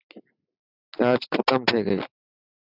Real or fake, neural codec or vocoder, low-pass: real; none; 5.4 kHz